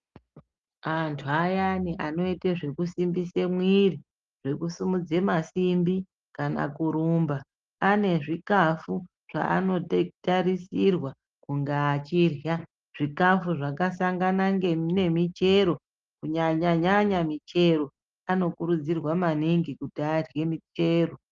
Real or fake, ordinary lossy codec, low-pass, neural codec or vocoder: real; Opus, 32 kbps; 7.2 kHz; none